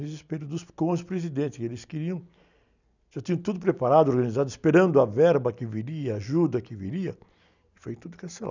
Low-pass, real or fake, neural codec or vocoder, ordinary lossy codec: 7.2 kHz; real; none; none